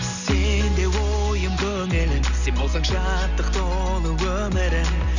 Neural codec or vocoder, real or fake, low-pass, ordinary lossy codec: none; real; 7.2 kHz; none